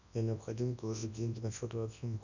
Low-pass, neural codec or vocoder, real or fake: 7.2 kHz; codec, 24 kHz, 0.9 kbps, WavTokenizer, large speech release; fake